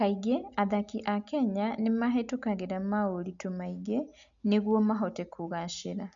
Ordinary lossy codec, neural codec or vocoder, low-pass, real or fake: none; none; 7.2 kHz; real